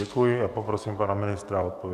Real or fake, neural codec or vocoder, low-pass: fake; codec, 44.1 kHz, 7.8 kbps, DAC; 14.4 kHz